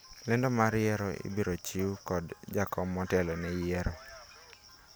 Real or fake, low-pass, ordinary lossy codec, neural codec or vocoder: real; none; none; none